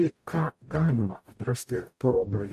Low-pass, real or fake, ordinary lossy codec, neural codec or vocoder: 14.4 kHz; fake; AAC, 96 kbps; codec, 44.1 kHz, 0.9 kbps, DAC